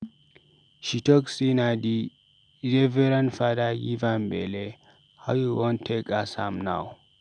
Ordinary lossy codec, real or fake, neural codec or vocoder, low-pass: none; real; none; 9.9 kHz